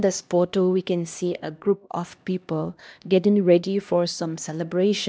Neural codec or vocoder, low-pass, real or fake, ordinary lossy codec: codec, 16 kHz, 1 kbps, X-Codec, HuBERT features, trained on LibriSpeech; none; fake; none